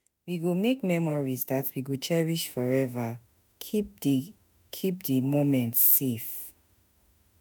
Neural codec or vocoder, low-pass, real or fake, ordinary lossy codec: autoencoder, 48 kHz, 32 numbers a frame, DAC-VAE, trained on Japanese speech; none; fake; none